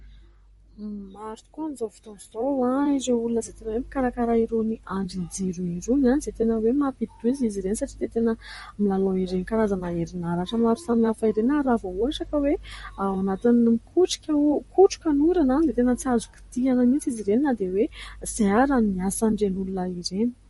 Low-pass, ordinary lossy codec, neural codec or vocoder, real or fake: 19.8 kHz; MP3, 48 kbps; vocoder, 44.1 kHz, 128 mel bands, Pupu-Vocoder; fake